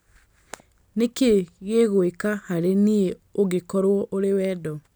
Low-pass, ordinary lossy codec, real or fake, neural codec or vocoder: none; none; real; none